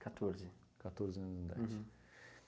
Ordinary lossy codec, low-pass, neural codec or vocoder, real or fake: none; none; none; real